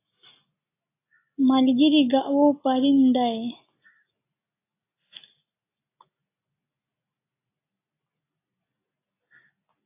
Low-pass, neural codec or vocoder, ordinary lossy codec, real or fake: 3.6 kHz; none; AAC, 24 kbps; real